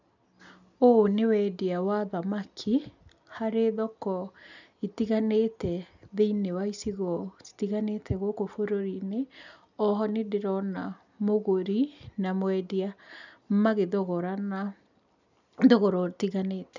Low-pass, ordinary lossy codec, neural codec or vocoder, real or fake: 7.2 kHz; none; none; real